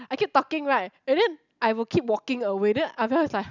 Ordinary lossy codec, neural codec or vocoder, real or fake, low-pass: none; none; real; 7.2 kHz